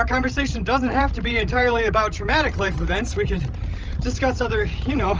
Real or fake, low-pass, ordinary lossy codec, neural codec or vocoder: fake; 7.2 kHz; Opus, 24 kbps; codec, 16 kHz, 16 kbps, FreqCodec, larger model